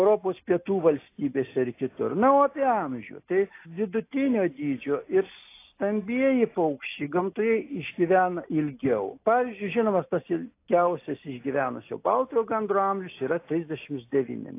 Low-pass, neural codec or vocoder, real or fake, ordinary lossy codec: 3.6 kHz; none; real; AAC, 24 kbps